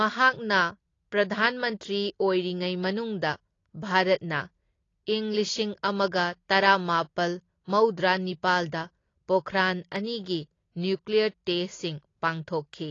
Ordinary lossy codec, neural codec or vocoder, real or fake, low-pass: AAC, 32 kbps; none; real; 7.2 kHz